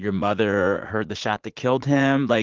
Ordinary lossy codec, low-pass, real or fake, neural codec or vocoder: Opus, 16 kbps; 7.2 kHz; fake; vocoder, 44.1 kHz, 80 mel bands, Vocos